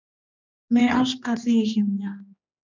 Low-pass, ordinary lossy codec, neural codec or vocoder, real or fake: 7.2 kHz; MP3, 64 kbps; codec, 16 kHz, 2 kbps, X-Codec, HuBERT features, trained on general audio; fake